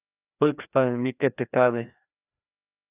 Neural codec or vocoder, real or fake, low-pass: codec, 16 kHz, 1 kbps, FreqCodec, larger model; fake; 3.6 kHz